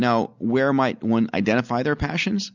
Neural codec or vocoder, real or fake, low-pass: none; real; 7.2 kHz